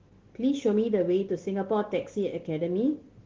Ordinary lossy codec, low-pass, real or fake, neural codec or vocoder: Opus, 16 kbps; 7.2 kHz; real; none